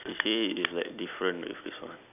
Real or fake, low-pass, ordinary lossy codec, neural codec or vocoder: real; 3.6 kHz; none; none